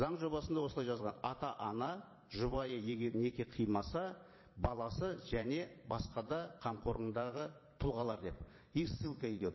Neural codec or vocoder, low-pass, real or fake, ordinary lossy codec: vocoder, 22.05 kHz, 80 mel bands, Vocos; 7.2 kHz; fake; MP3, 24 kbps